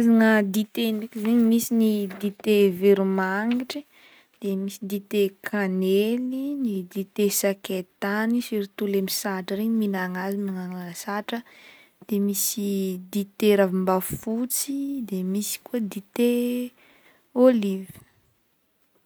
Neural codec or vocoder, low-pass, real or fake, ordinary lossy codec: none; none; real; none